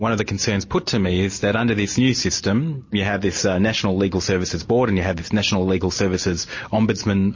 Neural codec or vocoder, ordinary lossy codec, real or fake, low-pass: none; MP3, 32 kbps; real; 7.2 kHz